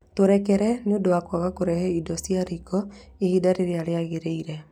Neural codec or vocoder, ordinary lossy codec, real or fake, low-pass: none; none; real; 19.8 kHz